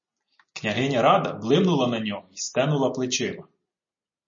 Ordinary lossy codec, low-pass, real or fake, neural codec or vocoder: MP3, 32 kbps; 7.2 kHz; real; none